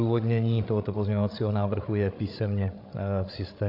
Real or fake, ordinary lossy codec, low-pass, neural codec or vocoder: fake; AAC, 32 kbps; 5.4 kHz; codec, 16 kHz, 16 kbps, FreqCodec, larger model